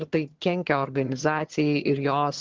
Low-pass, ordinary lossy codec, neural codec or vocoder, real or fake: 7.2 kHz; Opus, 16 kbps; vocoder, 22.05 kHz, 80 mel bands, HiFi-GAN; fake